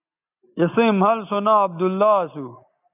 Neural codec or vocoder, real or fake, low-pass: none; real; 3.6 kHz